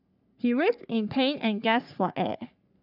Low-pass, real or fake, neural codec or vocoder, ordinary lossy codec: 5.4 kHz; fake; codec, 44.1 kHz, 3.4 kbps, Pupu-Codec; none